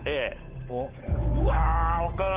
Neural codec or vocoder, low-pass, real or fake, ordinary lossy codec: codec, 16 kHz, 8 kbps, FunCodec, trained on Chinese and English, 25 frames a second; 3.6 kHz; fake; Opus, 16 kbps